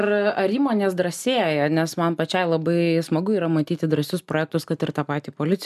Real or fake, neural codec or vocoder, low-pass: real; none; 14.4 kHz